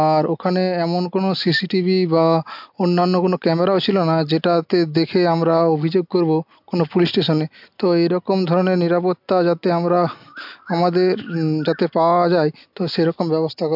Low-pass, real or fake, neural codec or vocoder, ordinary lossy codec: 5.4 kHz; real; none; MP3, 48 kbps